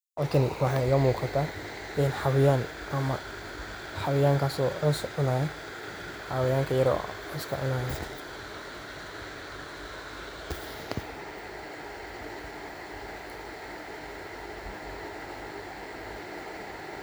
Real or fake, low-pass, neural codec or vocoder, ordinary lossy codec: real; none; none; none